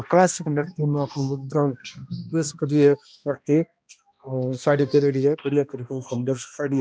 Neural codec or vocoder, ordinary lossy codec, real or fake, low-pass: codec, 16 kHz, 1 kbps, X-Codec, HuBERT features, trained on balanced general audio; none; fake; none